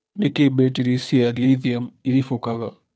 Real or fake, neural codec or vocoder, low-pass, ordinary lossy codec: fake; codec, 16 kHz, 2 kbps, FunCodec, trained on Chinese and English, 25 frames a second; none; none